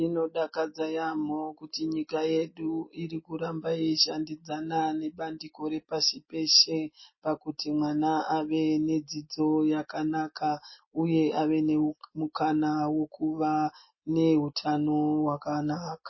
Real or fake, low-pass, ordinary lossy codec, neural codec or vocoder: real; 7.2 kHz; MP3, 24 kbps; none